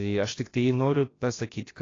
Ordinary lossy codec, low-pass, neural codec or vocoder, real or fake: AAC, 32 kbps; 7.2 kHz; codec, 16 kHz, about 1 kbps, DyCAST, with the encoder's durations; fake